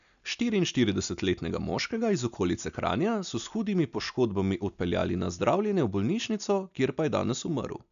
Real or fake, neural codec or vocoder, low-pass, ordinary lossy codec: real; none; 7.2 kHz; none